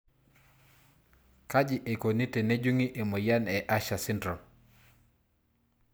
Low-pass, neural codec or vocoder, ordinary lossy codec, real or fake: none; none; none; real